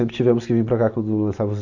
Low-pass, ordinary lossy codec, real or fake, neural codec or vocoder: 7.2 kHz; none; real; none